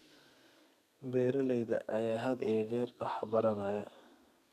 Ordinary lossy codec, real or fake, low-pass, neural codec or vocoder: none; fake; 14.4 kHz; codec, 32 kHz, 1.9 kbps, SNAC